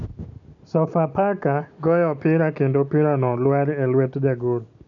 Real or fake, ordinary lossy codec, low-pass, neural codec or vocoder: real; none; 7.2 kHz; none